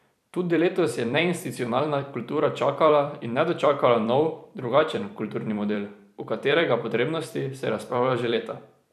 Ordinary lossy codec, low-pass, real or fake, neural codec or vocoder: none; 14.4 kHz; fake; vocoder, 44.1 kHz, 128 mel bands every 256 samples, BigVGAN v2